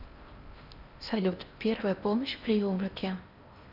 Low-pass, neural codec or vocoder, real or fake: 5.4 kHz; codec, 16 kHz in and 24 kHz out, 0.6 kbps, FocalCodec, streaming, 4096 codes; fake